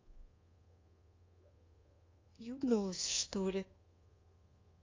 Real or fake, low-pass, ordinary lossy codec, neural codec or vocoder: fake; 7.2 kHz; AAC, 32 kbps; codec, 24 kHz, 1.2 kbps, DualCodec